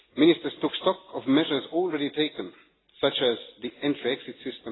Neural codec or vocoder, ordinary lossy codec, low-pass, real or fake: none; AAC, 16 kbps; 7.2 kHz; real